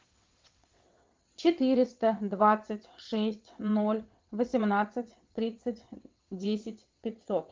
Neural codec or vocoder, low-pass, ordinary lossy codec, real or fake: vocoder, 22.05 kHz, 80 mel bands, WaveNeXt; 7.2 kHz; Opus, 32 kbps; fake